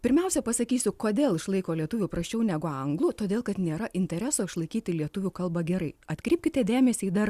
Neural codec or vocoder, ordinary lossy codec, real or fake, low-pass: none; Opus, 64 kbps; real; 14.4 kHz